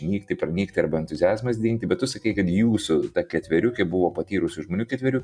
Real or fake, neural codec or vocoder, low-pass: real; none; 9.9 kHz